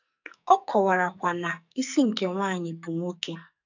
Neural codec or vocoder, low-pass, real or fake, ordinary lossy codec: codec, 44.1 kHz, 2.6 kbps, SNAC; 7.2 kHz; fake; none